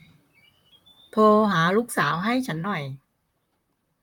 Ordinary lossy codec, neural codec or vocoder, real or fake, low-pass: none; vocoder, 44.1 kHz, 128 mel bands, Pupu-Vocoder; fake; 19.8 kHz